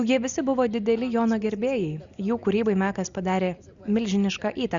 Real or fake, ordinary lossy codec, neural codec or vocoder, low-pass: real; Opus, 64 kbps; none; 7.2 kHz